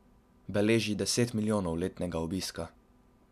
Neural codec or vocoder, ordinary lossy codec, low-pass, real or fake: none; none; 14.4 kHz; real